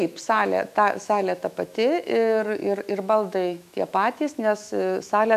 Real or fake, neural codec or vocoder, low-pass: fake; autoencoder, 48 kHz, 128 numbers a frame, DAC-VAE, trained on Japanese speech; 14.4 kHz